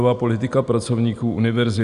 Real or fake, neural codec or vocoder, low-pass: real; none; 10.8 kHz